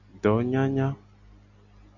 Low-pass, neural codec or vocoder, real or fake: 7.2 kHz; none; real